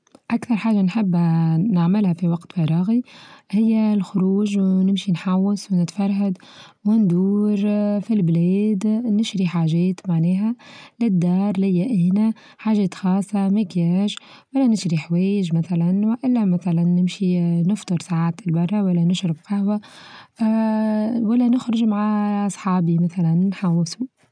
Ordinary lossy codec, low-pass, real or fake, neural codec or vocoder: none; 9.9 kHz; real; none